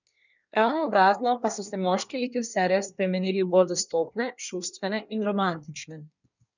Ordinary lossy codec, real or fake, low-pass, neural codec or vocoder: none; fake; 7.2 kHz; codec, 24 kHz, 1 kbps, SNAC